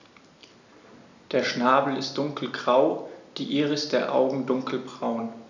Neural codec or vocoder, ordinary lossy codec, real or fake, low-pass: none; none; real; 7.2 kHz